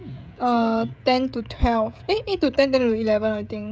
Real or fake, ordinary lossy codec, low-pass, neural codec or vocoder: fake; none; none; codec, 16 kHz, 16 kbps, FreqCodec, smaller model